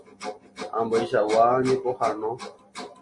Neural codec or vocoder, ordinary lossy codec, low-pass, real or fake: none; MP3, 48 kbps; 10.8 kHz; real